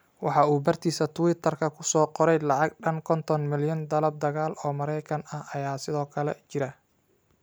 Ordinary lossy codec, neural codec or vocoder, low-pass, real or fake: none; none; none; real